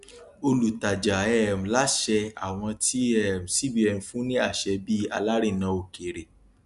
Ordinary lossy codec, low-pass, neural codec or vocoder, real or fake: Opus, 64 kbps; 10.8 kHz; none; real